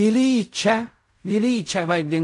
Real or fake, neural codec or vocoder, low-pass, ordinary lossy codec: fake; codec, 16 kHz in and 24 kHz out, 0.4 kbps, LongCat-Audio-Codec, fine tuned four codebook decoder; 10.8 kHz; AAC, 48 kbps